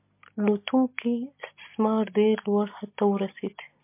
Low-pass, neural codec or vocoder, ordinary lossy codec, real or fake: 3.6 kHz; none; MP3, 24 kbps; real